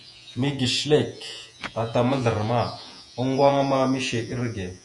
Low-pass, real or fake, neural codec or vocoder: 10.8 kHz; fake; vocoder, 48 kHz, 128 mel bands, Vocos